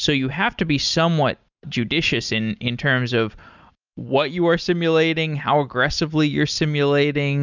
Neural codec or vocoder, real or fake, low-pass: none; real; 7.2 kHz